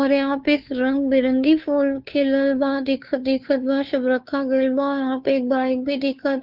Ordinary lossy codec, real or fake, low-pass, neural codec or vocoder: Opus, 24 kbps; fake; 5.4 kHz; codec, 16 kHz, 2 kbps, FunCodec, trained on Chinese and English, 25 frames a second